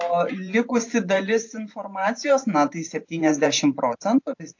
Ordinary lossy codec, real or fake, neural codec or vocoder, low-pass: AAC, 48 kbps; fake; vocoder, 44.1 kHz, 128 mel bands every 512 samples, BigVGAN v2; 7.2 kHz